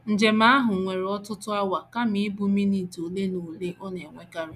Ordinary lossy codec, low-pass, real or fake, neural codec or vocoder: none; 14.4 kHz; real; none